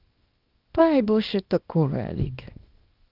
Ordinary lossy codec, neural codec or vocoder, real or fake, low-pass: Opus, 32 kbps; codec, 24 kHz, 0.9 kbps, WavTokenizer, small release; fake; 5.4 kHz